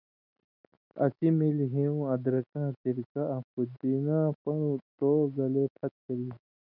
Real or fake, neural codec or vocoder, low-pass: real; none; 5.4 kHz